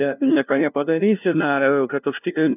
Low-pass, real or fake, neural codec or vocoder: 3.6 kHz; fake; codec, 16 kHz, 0.5 kbps, FunCodec, trained on LibriTTS, 25 frames a second